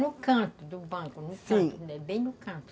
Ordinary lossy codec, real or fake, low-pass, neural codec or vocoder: none; real; none; none